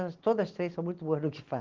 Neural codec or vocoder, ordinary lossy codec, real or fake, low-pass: none; Opus, 16 kbps; real; 7.2 kHz